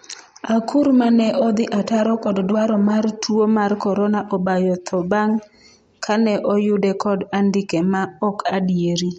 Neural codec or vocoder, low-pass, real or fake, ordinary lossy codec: none; 10.8 kHz; real; MP3, 48 kbps